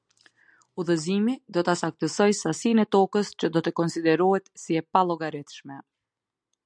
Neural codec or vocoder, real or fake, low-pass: none; real; 9.9 kHz